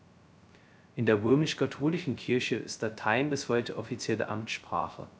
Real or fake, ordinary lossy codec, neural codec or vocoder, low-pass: fake; none; codec, 16 kHz, 0.2 kbps, FocalCodec; none